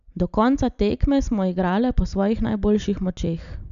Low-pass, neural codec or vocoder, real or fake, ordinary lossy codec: 7.2 kHz; none; real; none